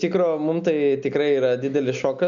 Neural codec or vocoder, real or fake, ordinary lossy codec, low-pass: none; real; MP3, 96 kbps; 7.2 kHz